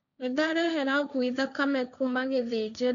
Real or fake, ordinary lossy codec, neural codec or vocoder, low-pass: fake; none; codec, 16 kHz, 1.1 kbps, Voila-Tokenizer; 7.2 kHz